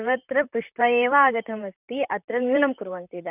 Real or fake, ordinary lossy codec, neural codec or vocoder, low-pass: fake; none; vocoder, 44.1 kHz, 128 mel bands, Pupu-Vocoder; 3.6 kHz